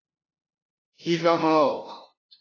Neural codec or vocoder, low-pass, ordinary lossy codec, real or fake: codec, 16 kHz, 0.5 kbps, FunCodec, trained on LibriTTS, 25 frames a second; 7.2 kHz; AAC, 32 kbps; fake